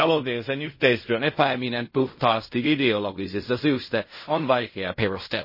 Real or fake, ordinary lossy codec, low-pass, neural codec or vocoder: fake; MP3, 24 kbps; 5.4 kHz; codec, 16 kHz in and 24 kHz out, 0.4 kbps, LongCat-Audio-Codec, fine tuned four codebook decoder